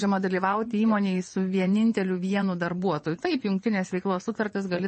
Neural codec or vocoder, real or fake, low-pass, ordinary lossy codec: vocoder, 22.05 kHz, 80 mel bands, WaveNeXt; fake; 9.9 kHz; MP3, 32 kbps